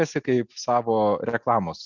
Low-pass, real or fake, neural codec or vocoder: 7.2 kHz; real; none